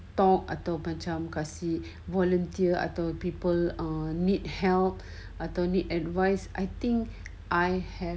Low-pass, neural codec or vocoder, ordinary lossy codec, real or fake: none; none; none; real